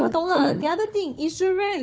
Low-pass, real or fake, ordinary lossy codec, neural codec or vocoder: none; fake; none; codec, 16 kHz, 4 kbps, FunCodec, trained on Chinese and English, 50 frames a second